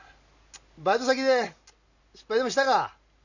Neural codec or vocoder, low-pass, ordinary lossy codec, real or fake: none; 7.2 kHz; none; real